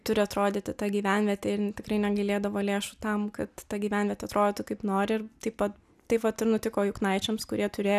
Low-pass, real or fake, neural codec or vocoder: 14.4 kHz; real; none